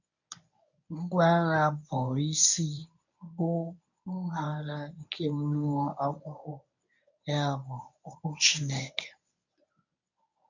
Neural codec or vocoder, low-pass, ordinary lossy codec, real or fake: codec, 24 kHz, 0.9 kbps, WavTokenizer, medium speech release version 1; 7.2 kHz; none; fake